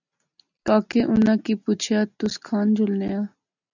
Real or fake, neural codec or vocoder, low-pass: real; none; 7.2 kHz